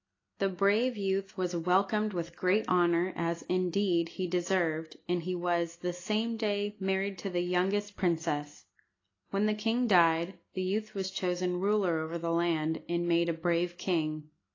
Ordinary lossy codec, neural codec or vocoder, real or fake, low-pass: AAC, 32 kbps; none; real; 7.2 kHz